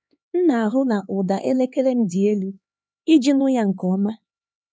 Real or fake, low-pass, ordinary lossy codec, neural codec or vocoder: fake; none; none; codec, 16 kHz, 4 kbps, X-Codec, HuBERT features, trained on LibriSpeech